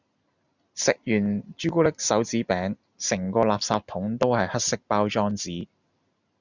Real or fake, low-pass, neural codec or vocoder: real; 7.2 kHz; none